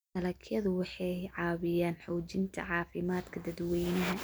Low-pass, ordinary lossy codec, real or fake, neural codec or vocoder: none; none; real; none